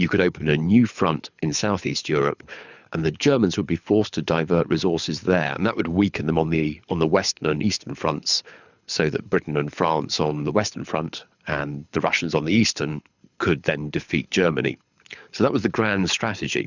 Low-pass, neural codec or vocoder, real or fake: 7.2 kHz; codec, 24 kHz, 6 kbps, HILCodec; fake